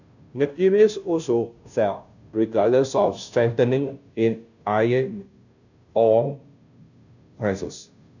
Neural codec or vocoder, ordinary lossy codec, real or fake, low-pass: codec, 16 kHz, 0.5 kbps, FunCodec, trained on Chinese and English, 25 frames a second; none; fake; 7.2 kHz